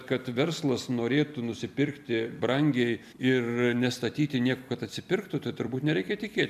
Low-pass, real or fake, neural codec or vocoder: 14.4 kHz; fake; vocoder, 44.1 kHz, 128 mel bands every 256 samples, BigVGAN v2